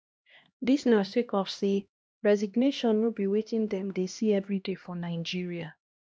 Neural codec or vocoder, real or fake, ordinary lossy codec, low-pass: codec, 16 kHz, 1 kbps, X-Codec, HuBERT features, trained on LibriSpeech; fake; none; none